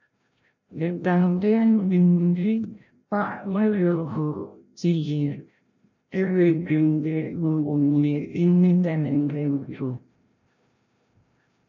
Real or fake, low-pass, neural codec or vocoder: fake; 7.2 kHz; codec, 16 kHz, 0.5 kbps, FreqCodec, larger model